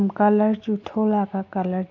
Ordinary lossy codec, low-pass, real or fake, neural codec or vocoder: AAC, 48 kbps; 7.2 kHz; real; none